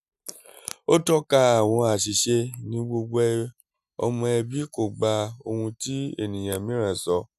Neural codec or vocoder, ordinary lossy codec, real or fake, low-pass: none; none; real; 14.4 kHz